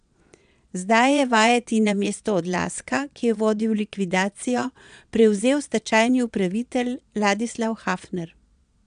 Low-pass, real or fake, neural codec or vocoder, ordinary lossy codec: 9.9 kHz; fake; vocoder, 22.05 kHz, 80 mel bands, Vocos; none